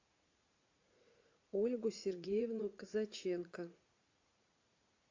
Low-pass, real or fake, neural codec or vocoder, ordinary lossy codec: 7.2 kHz; fake; vocoder, 44.1 kHz, 80 mel bands, Vocos; AAC, 48 kbps